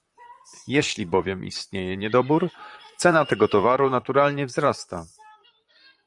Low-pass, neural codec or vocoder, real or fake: 10.8 kHz; vocoder, 44.1 kHz, 128 mel bands, Pupu-Vocoder; fake